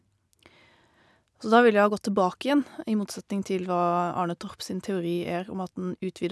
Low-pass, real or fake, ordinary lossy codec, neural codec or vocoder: none; real; none; none